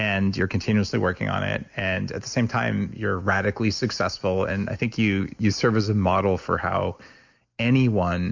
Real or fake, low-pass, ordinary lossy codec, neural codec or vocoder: real; 7.2 kHz; MP3, 64 kbps; none